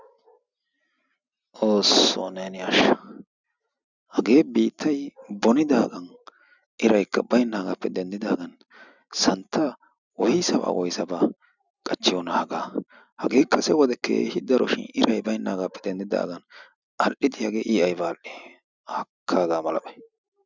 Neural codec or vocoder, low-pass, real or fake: none; 7.2 kHz; real